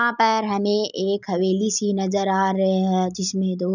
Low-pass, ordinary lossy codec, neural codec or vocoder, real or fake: 7.2 kHz; none; none; real